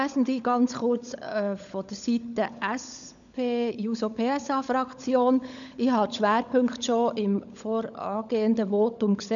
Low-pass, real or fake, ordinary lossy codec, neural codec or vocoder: 7.2 kHz; fake; none; codec, 16 kHz, 16 kbps, FunCodec, trained on LibriTTS, 50 frames a second